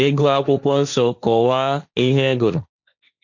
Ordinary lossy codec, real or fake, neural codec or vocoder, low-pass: none; fake; codec, 16 kHz, 1.1 kbps, Voila-Tokenizer; 7.2 kHz